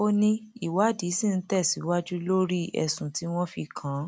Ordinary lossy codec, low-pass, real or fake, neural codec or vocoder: none; none; real; none